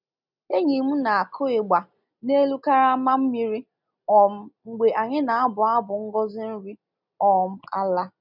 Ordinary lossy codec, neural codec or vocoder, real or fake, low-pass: none; none; real; 5.4 kHz